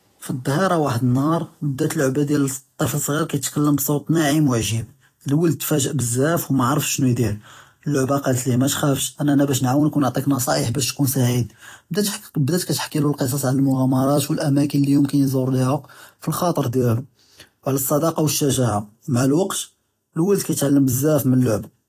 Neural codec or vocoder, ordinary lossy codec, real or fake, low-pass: vocoder, 44.1 kHz, 128 mel bands every 256 samples, BigVGAN v2; AAC, 48 kbps; fake; 14.4 kHz